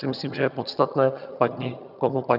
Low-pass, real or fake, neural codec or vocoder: 5.4 kHz; fake; vocoder, 22.05 kHz, 80 mel bands, HiFi-GAN